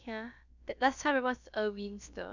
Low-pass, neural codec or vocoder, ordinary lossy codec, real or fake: 7.2 kHz; codec, 16 kHz, about 1 kbps, DyCAST, with the encoder's durations; none; fake